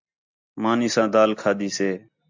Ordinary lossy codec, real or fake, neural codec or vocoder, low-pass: MP3, 64 kbps; real; none; 7.2 kHz